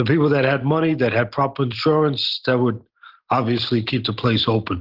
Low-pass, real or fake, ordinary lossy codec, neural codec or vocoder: 5.4 kHz; real; Opus, 16 kbps; none